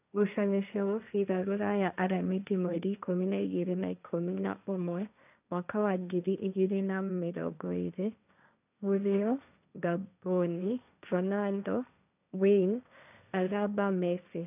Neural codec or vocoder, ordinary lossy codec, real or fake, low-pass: codec, 16 kHz, 1.1 kbps, Voila-Tokenizer; none; fake; 3.6 kHz